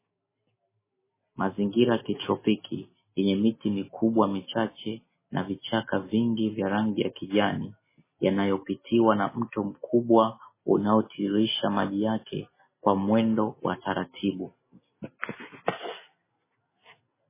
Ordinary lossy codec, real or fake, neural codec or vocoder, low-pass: MP3, 16 kbps; real; none; 3.6 kHz